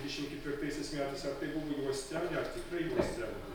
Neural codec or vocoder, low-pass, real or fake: none; 19.8 kHz; real